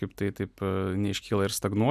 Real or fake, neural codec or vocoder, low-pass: real; none; 14.4 kHz